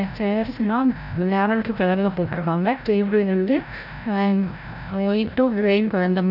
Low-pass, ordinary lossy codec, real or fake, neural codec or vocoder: 5.4 kHz; none; fake; codec, 16 kHz, 0.5 kbps, FreqCodec, larger model